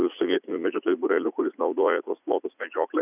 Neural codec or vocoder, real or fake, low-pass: vocoder, 44.1 kHz, 80 mel bands, Vocos; fake; 3.6 kHz